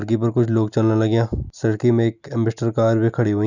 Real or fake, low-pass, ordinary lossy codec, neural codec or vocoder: real; 7.2 kHz; none; none